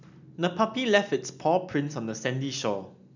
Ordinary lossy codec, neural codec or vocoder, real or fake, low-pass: none; none; real; 7.2 kHz